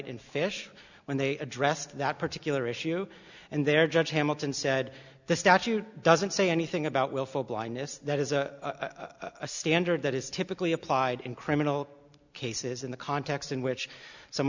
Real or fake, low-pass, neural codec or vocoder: real; 7.2 kHz; none